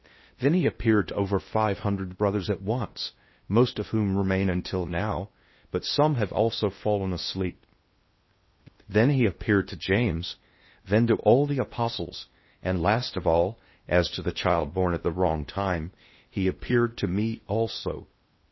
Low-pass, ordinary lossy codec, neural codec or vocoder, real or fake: 7.2 kHz; MP3, 24 kbps; codec, 16 kHz in and 24 kHz out, 0.8 kbps, FocalCodec, streaming, 65536 codes; fake